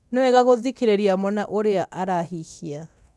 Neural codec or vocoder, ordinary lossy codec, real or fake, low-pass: codec, 24 kHz, 0.9 kbps, DualCodec; none; fake; none